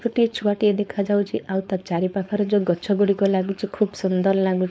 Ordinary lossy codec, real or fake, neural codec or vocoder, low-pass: none; fake; codec, 16 kHz, 4.8 kbps, FACodec; none